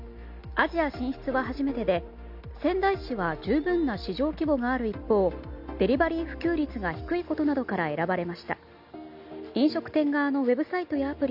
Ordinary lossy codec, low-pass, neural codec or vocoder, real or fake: MP3, 48 kbps; 5.4 kHz; none; real